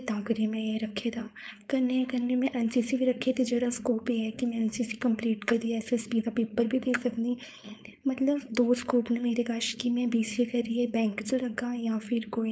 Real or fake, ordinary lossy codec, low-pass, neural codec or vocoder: fake; none; none; codec, 16 kHz, 4.8 kbps, FACodec